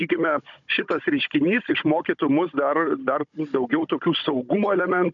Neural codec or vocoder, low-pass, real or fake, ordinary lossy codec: codec, 16 kHz, 16 kbps, FunCodec, trained on Chinese and English, 50 frames a second; 7.2 kHz; fake; MP3, 96 kbps